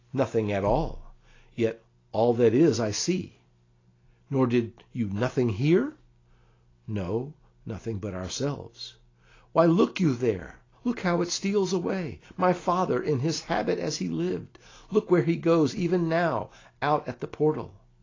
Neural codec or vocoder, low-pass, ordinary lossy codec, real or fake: none; 7.2 kHz; AAC, 32 kbps; real